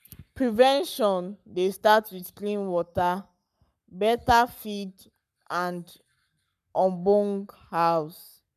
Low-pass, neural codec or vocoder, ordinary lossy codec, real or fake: 14.4 kHz; codec, 44.1 kHz, 7.8 kbps, Pupu-Codec; none; fake